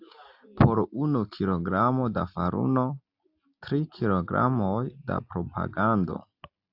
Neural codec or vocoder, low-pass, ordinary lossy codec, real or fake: none; 5.4 kHz; AAC, 48 kbps; real